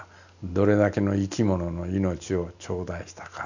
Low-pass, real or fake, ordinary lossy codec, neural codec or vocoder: 7.2 kHz; real; none; none